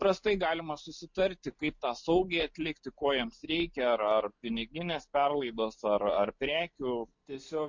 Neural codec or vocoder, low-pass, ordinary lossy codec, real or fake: none; 7.2 kHz; MP3, 48 kbps; real